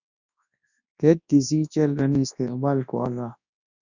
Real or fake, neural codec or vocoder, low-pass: fake; codec, 24 kHz, 0.9 kbps, WavTokenizer, large speech release; 7.2 kHz